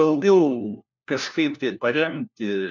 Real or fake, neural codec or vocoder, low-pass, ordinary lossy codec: fake; codec, 16 kHz, 1 kbps, FunCodec, trained on LibriTTS, 50 frames a second; 7.2 kHz; MP3, 64 kbps